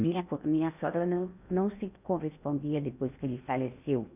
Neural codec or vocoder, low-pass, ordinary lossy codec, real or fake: codec, 16 kHz in and 24 kHz out, 0.8 kbps, FocalCodec, streaming, 65536 codes; 3.6 kHz; none; fake